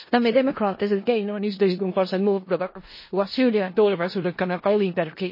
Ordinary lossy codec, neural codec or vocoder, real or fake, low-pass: MP3, 24 kbps; codec, 16 kHz in and 24 kHz out, 0.4 kbps, LongCat-Audio-Codec, four codebook decoder; fake; 5.4 kHz